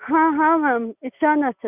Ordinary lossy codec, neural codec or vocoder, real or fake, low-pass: none; none; real; 3.6 kHz